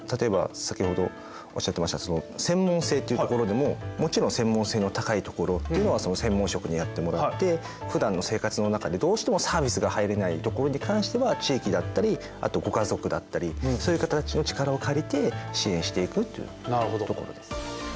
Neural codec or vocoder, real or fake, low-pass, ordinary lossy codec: none; real; none; none